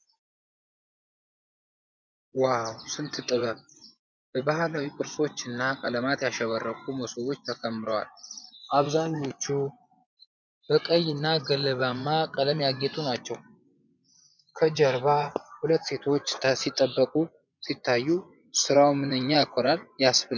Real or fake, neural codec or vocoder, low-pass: fake; vocoder, 24 kHz, 100 mel bands, Vocos; 7.2 kHz